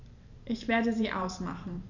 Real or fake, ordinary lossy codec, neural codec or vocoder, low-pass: fake; none; vocoder, 44.1 kHz, 128 mel bands every 512 samples, BigVGAN v2; 7.2 kHz